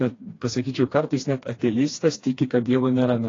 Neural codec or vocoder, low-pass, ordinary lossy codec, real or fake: codec, 16 kHz, 2 kbps, FreqCodec, smaller model; 7.2 kHz; AAC, 32 kbps; fake